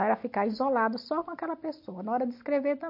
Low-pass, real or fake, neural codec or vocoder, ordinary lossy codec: 5.4 kHz; real; none; MP3, 32 kbps